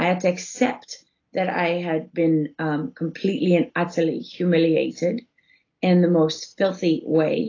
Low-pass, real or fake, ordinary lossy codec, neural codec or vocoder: 7.2 kHz; real; AAC, 32 kbps; none